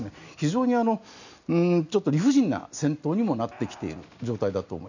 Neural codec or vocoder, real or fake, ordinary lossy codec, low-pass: none; real; none; 7.2 kHz